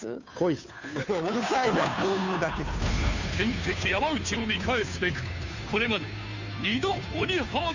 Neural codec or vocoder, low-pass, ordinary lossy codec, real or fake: codec, 16 kHz, 2 kbps, FunCodec, trained on Chinese and English, 25 frames a second; 7.2 kHz; none; fake